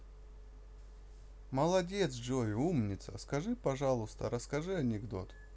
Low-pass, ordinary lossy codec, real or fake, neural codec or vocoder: none; none; real; none